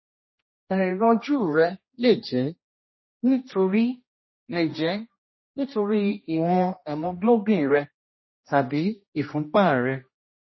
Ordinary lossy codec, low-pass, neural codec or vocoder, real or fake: MP3, 24 kbps; 7.2 kHz; codec, 16 kHz, 1 kbps, X-Codec, HuBERT features, trained on general audio; fake